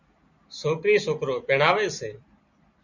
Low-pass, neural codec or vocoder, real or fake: 7.2 kHz; none; real